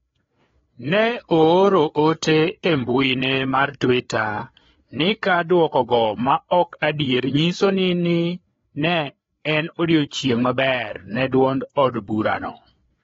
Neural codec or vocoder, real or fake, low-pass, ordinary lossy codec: codec, 16 kHz, 4 kbps, FreqCodec, larger model; fake; 7.2 kHz; AAC, 24 kbps